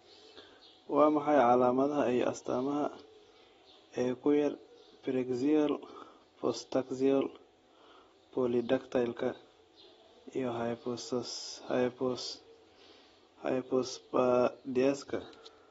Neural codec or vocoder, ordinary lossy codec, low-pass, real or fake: none; AAC, 24 kbps; 19.8 kHz; real